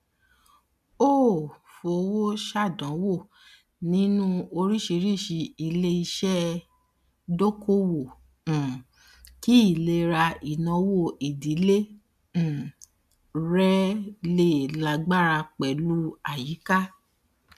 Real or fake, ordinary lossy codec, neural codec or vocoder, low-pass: real; MP3, 96 kbps; none; 14.4 kHz